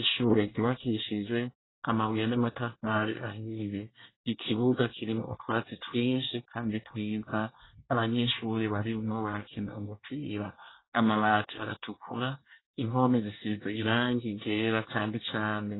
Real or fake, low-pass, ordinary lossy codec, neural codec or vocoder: fake; 7.2 kHz; AAC, 16 kbps; codec, 24 kHz, 1 kbps, SNAC